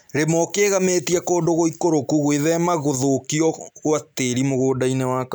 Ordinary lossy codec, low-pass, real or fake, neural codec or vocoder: none; none; real; none